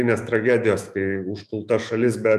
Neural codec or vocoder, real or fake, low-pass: autoencoder, 48 kHz, 128 numbers a frame, DAC-VAE, trained on Japanese speech; fake; 14.4 kHz